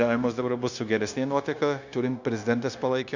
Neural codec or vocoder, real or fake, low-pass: codec, 16 kHz, 0.9 kbps, LongCat-Audio-Codec; fake; 7.2 kHz